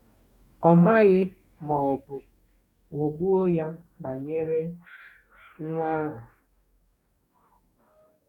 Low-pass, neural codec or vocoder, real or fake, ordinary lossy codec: 19.8 kHz; codec, 44.1 kHz, 2.6 kbps, DAC; fake; none